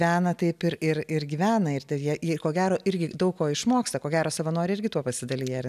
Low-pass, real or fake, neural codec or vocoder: 14.4 kHz; real; none